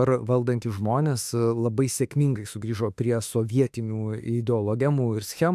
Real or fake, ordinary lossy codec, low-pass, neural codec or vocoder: fake; AAC, 96 kbps; 14.4 kHz; autoencoder, 48 kHz, 32 numbers a frame, DAC-VAE, trained on Japanese speech